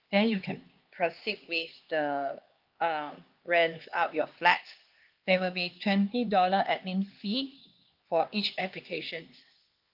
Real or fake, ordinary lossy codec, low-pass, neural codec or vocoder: fake; Opus, 32 kbps; 5.4 kHz; codec, 16 kHz, 2 kbps, X-Codec, HuBERT features, trained on LibriSpeech